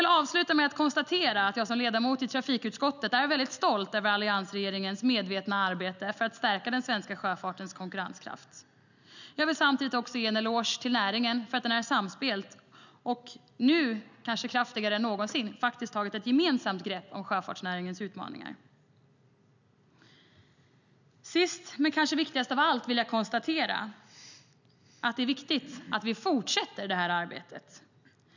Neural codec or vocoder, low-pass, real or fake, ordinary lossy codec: none; 7.2 kHz; real; none